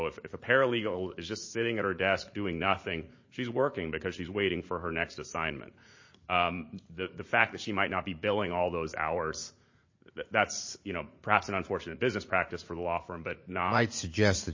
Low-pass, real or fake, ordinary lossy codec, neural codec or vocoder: 7.2 kHz; fake; MP3, 32 kbps; vocoder, 44.1 kHz, 80 mel bands, Vocos